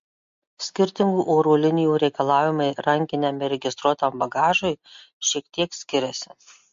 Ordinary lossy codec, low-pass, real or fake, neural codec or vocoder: MP3, 48 kbps; 7.2 kHz; real; none